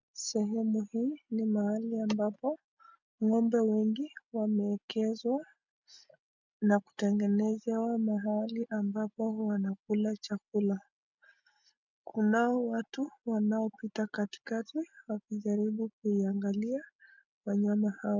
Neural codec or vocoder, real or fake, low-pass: none; real; 7.2 kHz